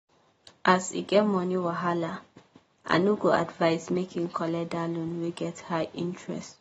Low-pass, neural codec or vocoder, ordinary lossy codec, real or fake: 19.8 kHz; none; AAC, 24 kbps; real